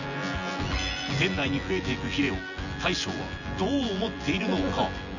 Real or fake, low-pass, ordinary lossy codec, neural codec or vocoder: fake; 7.2 kHz; none; vocoder, 24 kHz, 100 mel bands, Vocos